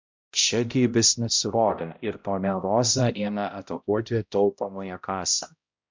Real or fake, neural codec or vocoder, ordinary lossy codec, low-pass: fake; codec, 16 kHz, 0.5 kbps, X-Codec, HuBERT features, trained on balanced general audio; MP3, 64 kbps; 7.2 kHz